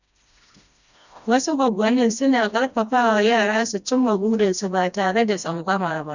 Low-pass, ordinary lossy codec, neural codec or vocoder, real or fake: 7.2 kHz; none; codec, 16 kHz, 1 kbps, FreqCodec, smaller model; fake